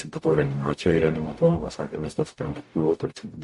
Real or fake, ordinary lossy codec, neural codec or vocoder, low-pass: fake; MP3, 48 kbps; codec, 44.1 kHz, 0.9 kbps, DAC; 14.4 kHz